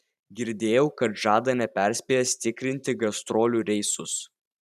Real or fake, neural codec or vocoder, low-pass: fake; vocoder, 44.1 kHz, 128 mel bands every 512 samples, BigVGAN v2; 14.4 kHz